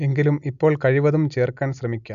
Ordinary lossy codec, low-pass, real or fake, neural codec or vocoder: none; 7.2 kHz; real; none